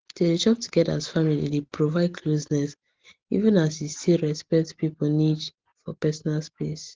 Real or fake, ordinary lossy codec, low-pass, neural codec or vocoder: real; Opus, 16 kbps; 7.2 kHz; none